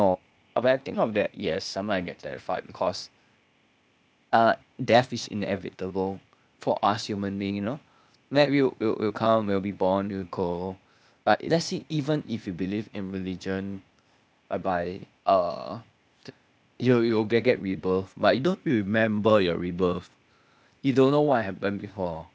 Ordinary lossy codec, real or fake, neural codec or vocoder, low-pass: none; fake; codec, 16 kHz, 0.8 kbps, ZipCodec; none